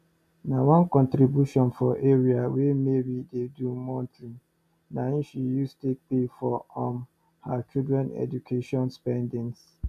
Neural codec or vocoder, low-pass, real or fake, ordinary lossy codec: none; 14.4 kHz; real; MP3, 96 kbps